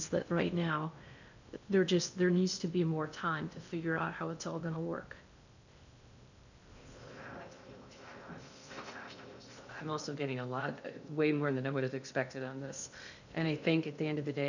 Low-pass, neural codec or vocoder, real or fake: 7.2 kHz; codec, 16 kHz in and 24 kHz out, 0.6 kbps, FocalCodec, streaming, 2048 codes; fake